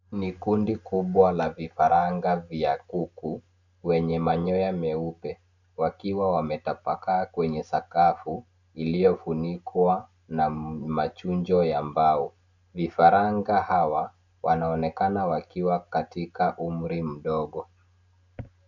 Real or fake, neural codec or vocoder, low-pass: real; none; 7.2 kHz